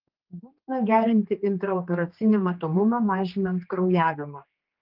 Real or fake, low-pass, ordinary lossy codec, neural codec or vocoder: fake; 5.4 kHz; Opus, 32 kbps; codec, 16 kHz, 2 kbps, X-Codec, HuBERT features, trained on general audio